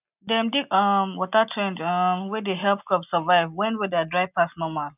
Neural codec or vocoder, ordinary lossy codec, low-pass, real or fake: none; none; 3.6 kHz; real